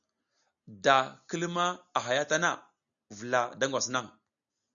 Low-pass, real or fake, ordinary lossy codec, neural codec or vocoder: 7.2 kHz; real; MP3, 96 kbps; none